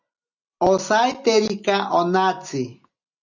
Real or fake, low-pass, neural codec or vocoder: real; 7.2 kHz; none